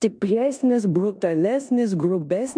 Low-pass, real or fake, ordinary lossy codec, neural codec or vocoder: 9.9 kHz; fake; MP3, 64 kbps; codec, 16 kHz in and 24 kHz out, 0.9 kbps, LongCat-Audio-Codec, four codebook decoder